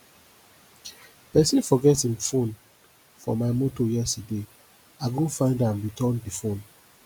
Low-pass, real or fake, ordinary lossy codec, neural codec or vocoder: none; real; none; none